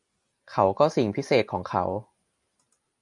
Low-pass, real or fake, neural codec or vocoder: 10.8 kHz; real; none